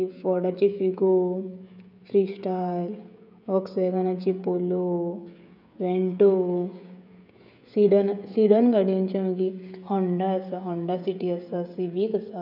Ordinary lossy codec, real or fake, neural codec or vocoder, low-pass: none; fake; codec, 16 kHz, 16 kbps, FreqCodec, smaller model; 5.4 kHz